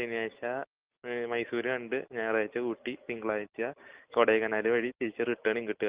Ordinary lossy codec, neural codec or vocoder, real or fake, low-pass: Opus, 24 kbps; none; real; 3.6 kHz